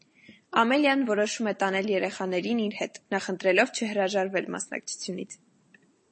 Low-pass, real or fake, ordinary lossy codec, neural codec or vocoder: 9.9 kHz; real; MP3, 32 kbps; none